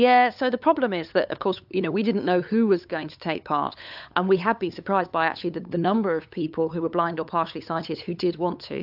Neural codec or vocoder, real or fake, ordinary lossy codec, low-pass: codec, 16 kHz, 8 kbps, FunCodec, trained on LibriTTS, 25 frames a second; fake; AAC, 48 kbps; 5.4 kHz